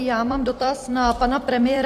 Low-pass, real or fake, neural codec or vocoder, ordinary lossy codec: 14.4 kHz; fake; vocoder, 44.1 kHz, 128 mel bands every 256 samples, BigVGAN v2; AAC, 64 kbps